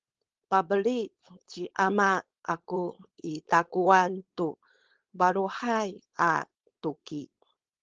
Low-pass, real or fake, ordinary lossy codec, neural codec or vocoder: 7.2 kHz; fake; Opus, 16 kbps; codec, 16 kHz, 4.8 kbps, FACodec